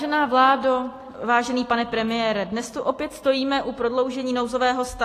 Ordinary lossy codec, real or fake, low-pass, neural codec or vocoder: AAC, 48 kbps; real; 14.4 kHz; none